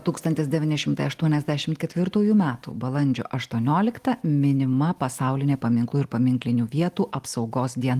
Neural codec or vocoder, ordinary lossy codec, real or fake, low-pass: none; Opus, 24 kbps; real; 14.4 kHz